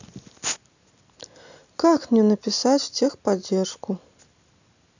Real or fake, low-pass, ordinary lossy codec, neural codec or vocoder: real; 7.2 kHz; none; none